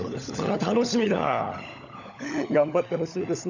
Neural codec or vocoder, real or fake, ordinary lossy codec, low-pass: codec, 16 kHz, 16 kbps, FunCodec, trained on LibriTTS, 50 frames a second; fake; none; 7.2 kHz